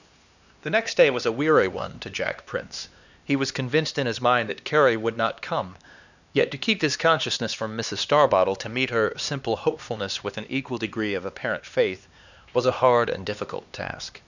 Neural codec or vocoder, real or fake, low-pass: codec, 16 kHz, 2 kbps, X-Codec, HuBERT features, trained on LibriSpeech; fake; 7.2 kHz